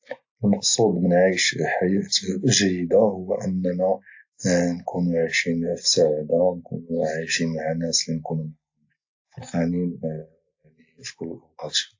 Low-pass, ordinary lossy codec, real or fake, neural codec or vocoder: 7.2 kHz; AAC, 48 kbps; real; none